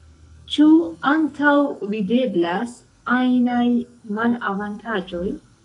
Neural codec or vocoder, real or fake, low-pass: codec, 44.1 kHz, 2.6 kbps, SNAC; fake; 10.8 kHz